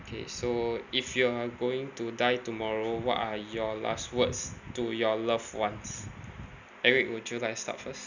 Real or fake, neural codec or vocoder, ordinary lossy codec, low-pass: real; none; none; 7.2 kHz